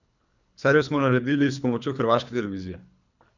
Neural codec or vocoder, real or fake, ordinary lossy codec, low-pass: codec, 24 kHz, 3 kbps, HILCodec; fake; none; 7.2 kHz